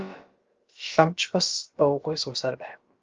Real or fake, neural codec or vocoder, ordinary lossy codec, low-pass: fake; codec, 16 kHz, about 1 kbps, DyCAST, with the encoder's durations; Opus, 24 kbps; 7.2 kHz